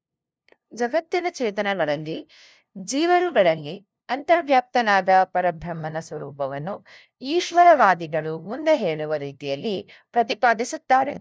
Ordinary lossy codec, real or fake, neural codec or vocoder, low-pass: none; fake; codec, 16 kHz, 0.5 kbps, FunCodec, trained on LibriTTS, 25 frames a second; none